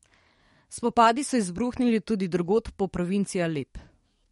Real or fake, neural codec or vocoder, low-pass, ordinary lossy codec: fake; codec, 44.1 kHz, 7.8 kbps, DAC; 19.8 kHz; MP3, 48 kbps